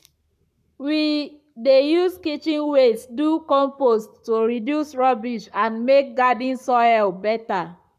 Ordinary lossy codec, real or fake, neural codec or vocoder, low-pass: none; fake; codec, 44.1 kHz, 7.8 kbps, Pupu-Codec; 14.4 kHz